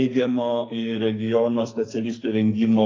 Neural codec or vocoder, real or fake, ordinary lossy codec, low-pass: codec, 44.1 kHz, 2.6 kbps, SNAC; fake; AAC, 32 kbps; 7.2 kHz